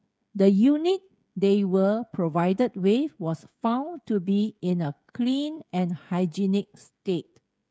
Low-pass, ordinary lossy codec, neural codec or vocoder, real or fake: none; none; codec, 16 kHz, 16 kbps, FreqCodec, smaller model; fake